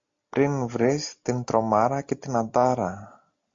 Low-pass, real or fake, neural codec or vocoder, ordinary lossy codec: 7.2 kHz; real; none; MP3, 48 kbps